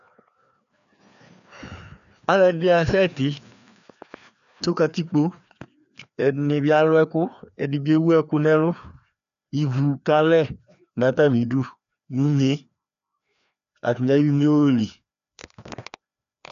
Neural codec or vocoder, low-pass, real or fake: codec, 16 kHz, 2 kbps, FreqCodec, larger model; 7.2 kHz; fake